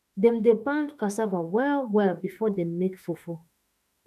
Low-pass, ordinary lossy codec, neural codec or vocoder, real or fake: 14.4 kHz; none; autoencoder, 48 kHz, 32 numbers a frame, DAC-VAE, trained on Japanese speech; fake